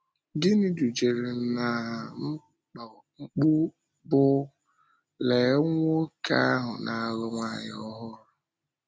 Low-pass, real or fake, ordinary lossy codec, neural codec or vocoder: none; real; none; none